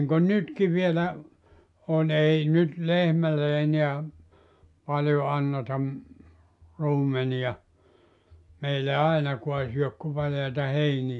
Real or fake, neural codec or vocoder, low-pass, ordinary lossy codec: real; none; 10.8 kHz; AAC, 64 kbps